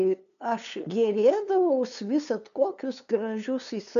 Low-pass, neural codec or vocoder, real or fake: 7.2 kHz; codec, 16 kHz, 2 kbps, FunCodec, trained on LibriTTS, 25 frames a second; fake